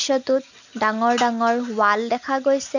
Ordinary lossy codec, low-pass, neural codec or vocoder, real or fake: none; 7.2 kHz; none; real